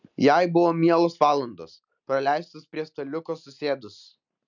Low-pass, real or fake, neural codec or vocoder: 7.2 kHz; real; none